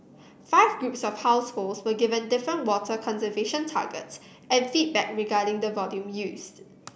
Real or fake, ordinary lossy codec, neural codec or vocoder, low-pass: real; none; none; none